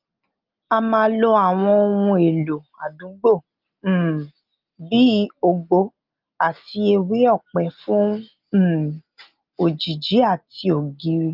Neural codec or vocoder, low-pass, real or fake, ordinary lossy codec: none; 5.4 kHz; real; Opus, 32 kbps